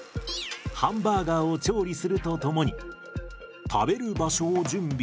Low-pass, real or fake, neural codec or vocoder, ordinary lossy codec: none; real; none; none